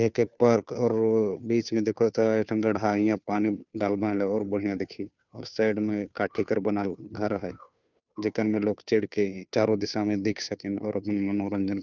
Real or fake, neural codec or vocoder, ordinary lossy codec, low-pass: fake; codec, 16 kHz, 2 kbps, FunCodec, trained on Chinese and English, 25 frames a second; none; 7.2 kHz